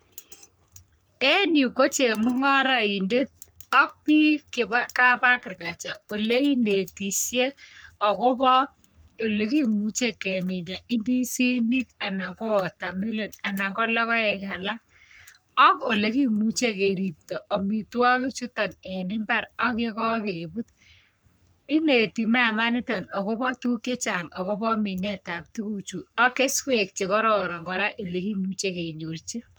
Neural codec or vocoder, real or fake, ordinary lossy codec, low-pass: codec, 44.1 kHz, 3.4 kbps, Pupu-Codec; fake; none; none